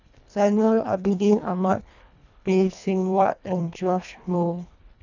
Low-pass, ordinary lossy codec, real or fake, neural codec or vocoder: 7.2 kHz; none; fake; codec, 24 kHz, 1.5 kbps, HILCodec